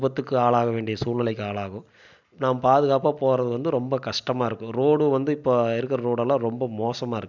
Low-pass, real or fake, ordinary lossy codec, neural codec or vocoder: 7.2 kHz; real; none; none